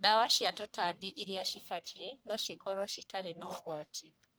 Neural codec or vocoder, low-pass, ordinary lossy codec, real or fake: codec, 44.1 kHz, 1.7 kbps, Pupu-Codec; none; none; fake